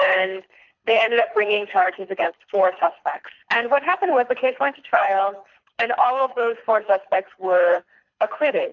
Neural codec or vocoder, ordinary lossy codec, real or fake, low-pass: codec, 24 kHz, 3 kbps, HILCodec; MP3, 64 kbps; fake; 7.2 kHz